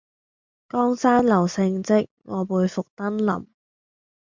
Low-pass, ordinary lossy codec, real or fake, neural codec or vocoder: 7.2 kHz; MP3, 64 kbps; real; none